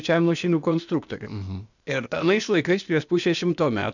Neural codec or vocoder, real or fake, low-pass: codec, 16 kHz, 0.8 kbps, ZipCodec; fake; 7.2 kHz